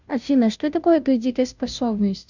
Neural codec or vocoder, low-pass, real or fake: codec, 16 kHz, 0.5 kbps, FunCodec, trained on Chinese and English, 25 frames a second; 7.2 kHz; fake